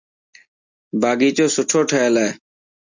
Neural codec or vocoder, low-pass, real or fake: none; 7.2 kHz; real